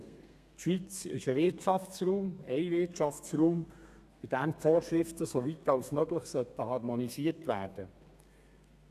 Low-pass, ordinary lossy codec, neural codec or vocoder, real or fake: 14.4 kHz; none; codec, 32 kHz, 1.9 kbps, SNAC; fake